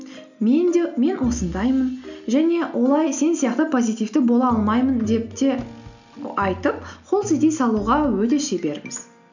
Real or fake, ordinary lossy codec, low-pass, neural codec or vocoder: real; none; 7.2 kHz; none